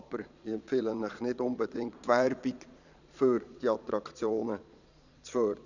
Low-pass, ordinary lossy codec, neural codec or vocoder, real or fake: 7.2 kHz; none; vocoder, 22.05 kHz, 80 mel bands, Vocos; fake